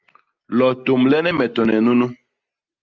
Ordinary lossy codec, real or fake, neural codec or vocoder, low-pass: Opus, 24 kbps; real; none; 7.2 kHz